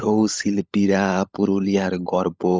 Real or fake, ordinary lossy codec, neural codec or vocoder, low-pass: fake; none; codec, 16 kHz, 16 kbps, FunCodec, trained on LibriTTS, 50 frames a second; none